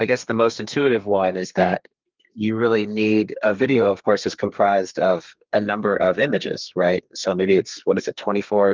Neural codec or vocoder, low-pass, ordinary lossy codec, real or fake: codec, 32 kHz, 1.9 kbps, SNAC; 7.2 kHz; Opus, 24 kbps; fake